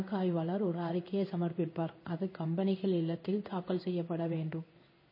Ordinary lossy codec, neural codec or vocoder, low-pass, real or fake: MP3, 24 kbps; codec, 16 kHz in and 24 kHz out, 1 kbps, XY-Tokenizer; 5.4 kHz; fake